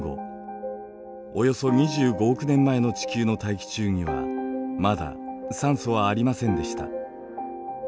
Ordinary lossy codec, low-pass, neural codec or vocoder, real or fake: none; none; none; real